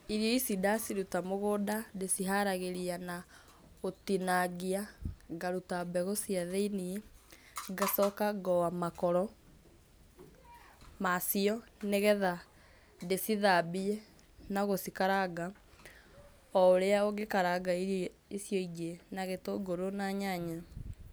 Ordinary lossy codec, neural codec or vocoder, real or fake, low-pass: none; none; real; none